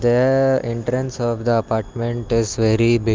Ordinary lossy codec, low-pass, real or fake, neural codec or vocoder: Opus, 32 kbps; 7.2 kHz; real; none